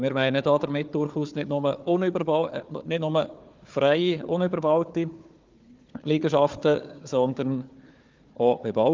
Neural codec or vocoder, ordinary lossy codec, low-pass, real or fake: codec, 16 kHz, 4 kbps, FunCodec, trained on Chinese and English, 50 frames a second; Opus, 24 kbps; 7.2 kHz; fake